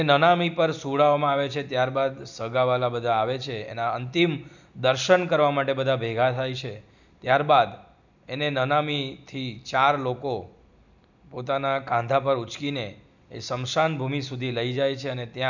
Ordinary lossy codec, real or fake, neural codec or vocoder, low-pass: none; real; none; 7.2 kHz